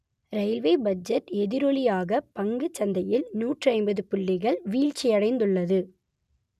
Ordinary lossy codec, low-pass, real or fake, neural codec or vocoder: none; 14.4 kHz; real; none